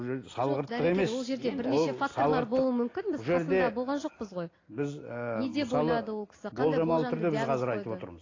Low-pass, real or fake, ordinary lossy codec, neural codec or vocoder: 7.2 kHz; real; AAC, 32 kbps; none